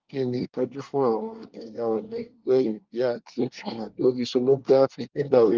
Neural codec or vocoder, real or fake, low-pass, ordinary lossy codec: codec, 24 kHz, 1 kbps, SNAC; fake; 7.2 kHz; Opus, 24 kbps